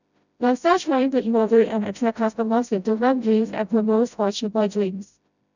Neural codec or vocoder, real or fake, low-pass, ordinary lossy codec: codec, 16 kHz, 0.5 kbps, FreqCodec, smaller model; fake; 7.2 kHz; none